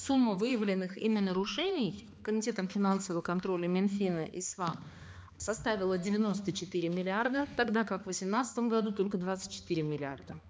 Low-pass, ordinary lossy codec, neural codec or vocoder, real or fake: none; none; codec, 16 kHz, 2 kbps, X-Codec, HuBERT features, trained on balanced general audio; fake